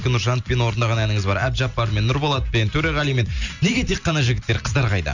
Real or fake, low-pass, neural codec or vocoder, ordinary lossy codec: real; 7.2 kHz; none; none